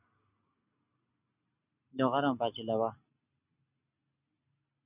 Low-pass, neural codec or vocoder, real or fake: 3.6 kHz; none; real